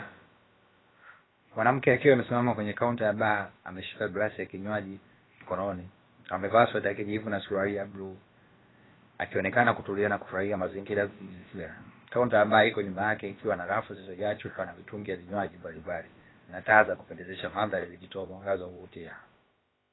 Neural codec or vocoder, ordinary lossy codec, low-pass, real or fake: codec, 16 kHz, about 1 kbps, DyCAST, with the encoder's durations; AAC, 16 kbps; 7.2 kHz; fake